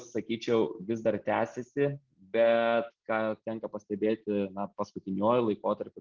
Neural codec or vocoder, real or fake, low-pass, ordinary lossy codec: none; real; 7.2 kHz; Opus, 16 kbps